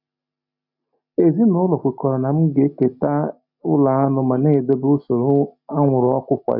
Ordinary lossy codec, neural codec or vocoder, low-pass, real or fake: none; none; 5.4 kHz; real